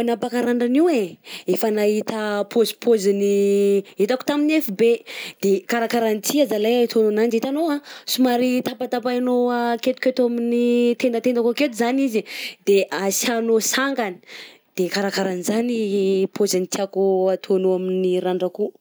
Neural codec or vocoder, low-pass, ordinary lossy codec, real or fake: none; none; none; real